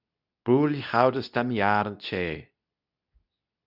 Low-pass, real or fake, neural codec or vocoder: 5.4 kHz; fake; codec, 24 kHz, 0.9 kbps, WavTokenizer, medium speech release version 2